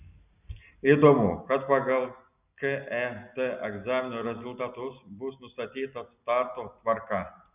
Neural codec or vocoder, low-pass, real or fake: none; 3.6 kHz; real